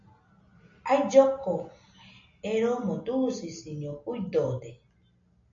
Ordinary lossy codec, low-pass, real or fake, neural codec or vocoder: MP3, 48 kbps; 7.2 kHz; real; none